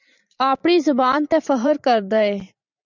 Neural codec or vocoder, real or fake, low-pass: vocoder, 44.1 kHz, 128 mel bands every 512 samples, BigVGAN v2; fake; 7.2 kHz